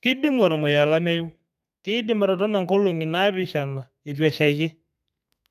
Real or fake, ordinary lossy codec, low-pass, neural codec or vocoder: fake; none; 14.4 kHz; codec, 32 kHz, 1.9 kbps, SNAC